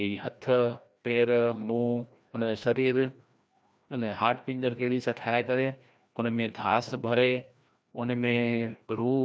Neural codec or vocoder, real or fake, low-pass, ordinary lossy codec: codec, 16 kHz, 1 kbps, FreqCodec, larger model; fake; none; none